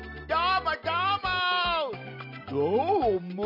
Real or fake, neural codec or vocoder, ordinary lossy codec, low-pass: real; none; none; 5.4 kHz